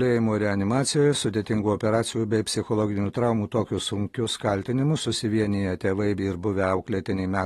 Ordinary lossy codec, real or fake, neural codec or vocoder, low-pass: AAC, 32 kbps; real; none; 19.8 kHz